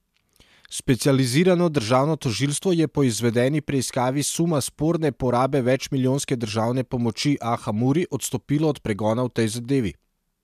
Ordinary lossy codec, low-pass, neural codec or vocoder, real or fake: MP3, 96 kbps; 14.4 kHz; none; real